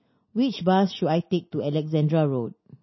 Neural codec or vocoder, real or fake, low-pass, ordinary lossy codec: none; real; 7.2 kHz; MP3, 24 kbps